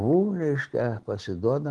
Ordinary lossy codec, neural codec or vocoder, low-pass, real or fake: Opus, 16 kbps; none; 10.8 kHz; real